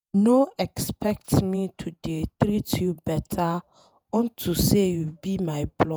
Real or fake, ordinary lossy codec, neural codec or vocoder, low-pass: fake; none; vocoder, 48 kHz, 128 mel bands, Vocos; none